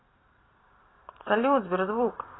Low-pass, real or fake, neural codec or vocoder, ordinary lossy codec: 7.2 kHz; fake; vocoder, 44.1 kHz, 128 mel bands every 512 samples, BigVGAN v2; AAC, 16 kbps